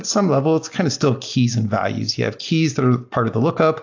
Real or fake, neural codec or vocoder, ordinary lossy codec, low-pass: real; none; AAC, 48 kbps; 7.2 kHz